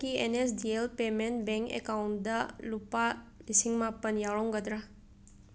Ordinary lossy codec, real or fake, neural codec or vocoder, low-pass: none; real; none; none